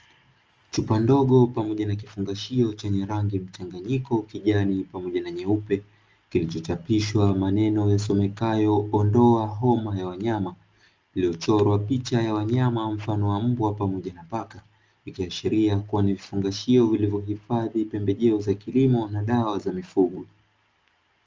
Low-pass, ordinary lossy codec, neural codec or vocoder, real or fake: 7.2 kHz; Opus, 24 kbps; none; real